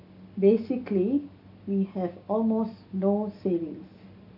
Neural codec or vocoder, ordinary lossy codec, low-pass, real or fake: none; AAC, 32 kbps; 5.4 kHz; real